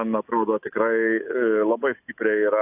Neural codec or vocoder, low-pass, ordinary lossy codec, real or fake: autoencoder, 48 kHz, 128 numbers a frame, DAC-VAE, trained on Japanese speech; 3.6 kHz; AAC, 32 kbps; fake